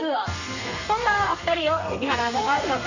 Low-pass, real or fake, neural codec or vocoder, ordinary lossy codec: 7.2 kHz; fake; codec, 32 kHz, 1.9 kbps, SNAC; none